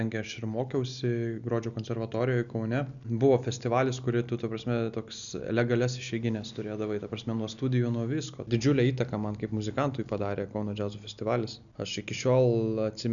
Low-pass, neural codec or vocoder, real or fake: 7.2 kHz; none; real